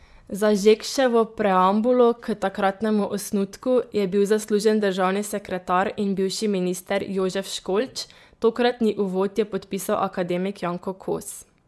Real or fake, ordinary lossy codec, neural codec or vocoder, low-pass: fake; none; vocoder, 24 kHz, 100 mel bands, Vocos; none